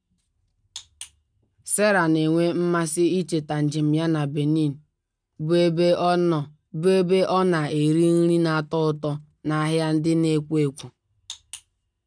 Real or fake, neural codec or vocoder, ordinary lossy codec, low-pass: real; none; none; 9.9 kHz